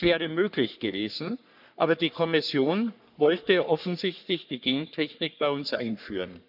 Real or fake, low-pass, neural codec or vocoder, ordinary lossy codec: fake; 5.4 kHz; codec, 44.1 kHz, 3.4 kbps, Pupu-Codec; none